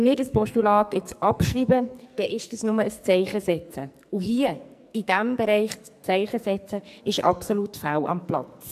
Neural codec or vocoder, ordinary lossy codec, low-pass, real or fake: codec, 44.1 kHz, 2.6 kbps, SNAC; AAC, 96 kbps; 14.4 kHz; fake